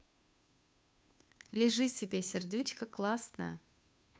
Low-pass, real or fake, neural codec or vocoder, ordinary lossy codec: none; fake; codec, 16 kHz, 2 kbps, FunCodec, trained on Chinese and English, 25 frames a second; none